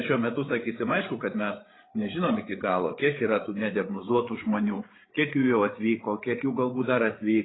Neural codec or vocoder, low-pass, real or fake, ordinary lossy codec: codec, 16 kHz, 8 kbps, FreqCodec, larger model; 7.2 kHz; fake; AAC, 16 kbps